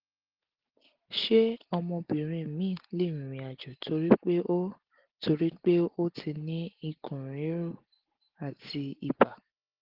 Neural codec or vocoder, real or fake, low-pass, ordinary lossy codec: none; real; 5.4 kHz; Opus, 16 kbps